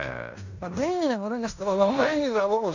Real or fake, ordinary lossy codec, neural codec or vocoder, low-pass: fake; none; codec, 16 kHz in and 24 kHz out, 0.9 kbps, LongCat-Audio-Codec, fine tuned four codebook decoder; 7.2 kHz